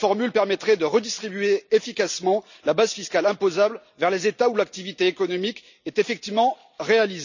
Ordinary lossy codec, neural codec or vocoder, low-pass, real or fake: none; none; 7.2 kHz; real